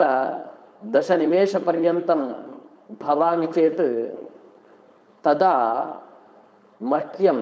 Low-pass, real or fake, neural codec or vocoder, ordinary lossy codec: none; fake; codec, 16 kHz, 4.8 kbps, FACodec; none